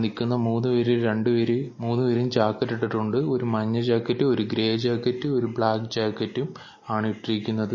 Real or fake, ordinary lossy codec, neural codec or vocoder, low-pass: real; MP3, 32 kbps; none; 7.2 kHz